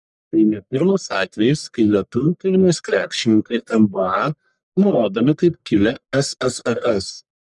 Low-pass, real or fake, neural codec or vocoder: 10.8 kHz; fake; codec, 44.1 kHz, 1.7 kbps, Pupu-Codec